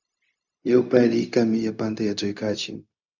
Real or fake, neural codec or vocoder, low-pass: fake; codec, 16 kHz, 0.4 kbps, LongCat-Audio-Codec; 7.2 kHz